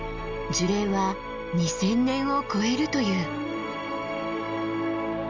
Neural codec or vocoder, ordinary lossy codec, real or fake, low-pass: none; Opus, 32 kbps; real; 7.2 kHz